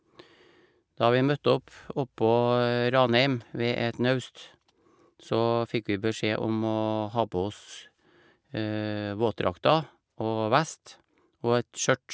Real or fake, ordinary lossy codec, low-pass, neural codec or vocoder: real; none; none; none